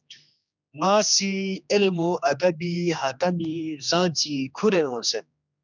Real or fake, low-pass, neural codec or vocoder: fake; 7.2 kHz; codec, 16 kHz, 2 kbps, X-Codec, HuBERT features, trained on general audio